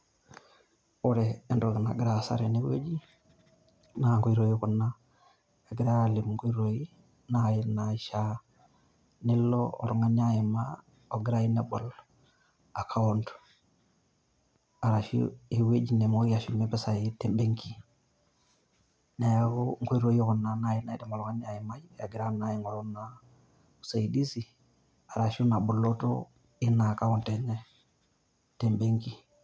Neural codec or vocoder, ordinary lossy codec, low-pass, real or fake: none; none; none; real